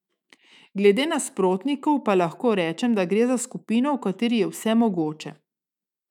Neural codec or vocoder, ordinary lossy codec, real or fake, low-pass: autoencoder, 48 kHz, 128 numbers a frame, DAC-VAE, trained on Japanese speech; none; fake; 19.8 kHz